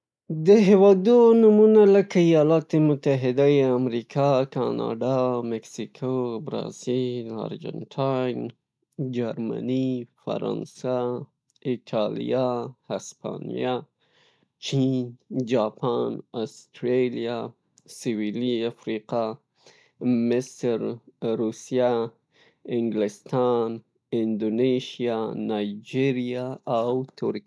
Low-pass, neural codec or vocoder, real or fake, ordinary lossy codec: none; none; real; none